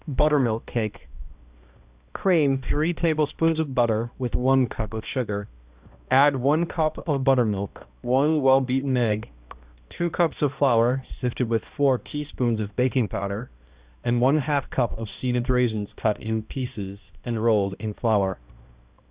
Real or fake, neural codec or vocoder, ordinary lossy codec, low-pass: fake; codec, 16 kHz, 1 kbps, X-Codec, HuBERT features, trained on balanced general audio; Opus, 64 kbps; 3.6 kHz